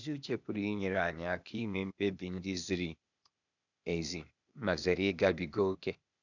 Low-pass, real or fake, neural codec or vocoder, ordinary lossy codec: 7.2 kHz; fake; codec, 16 kHz, 0.8 kbps, ZipCodec; none